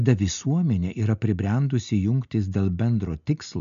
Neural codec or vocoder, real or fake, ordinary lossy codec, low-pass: none; real; AAC, 96 kbps; 7.2 kHz